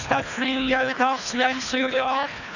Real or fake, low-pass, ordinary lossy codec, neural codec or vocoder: fake; 7.2 kHz; none; codec, 24 kHz, 1.5 kbps, HILCodec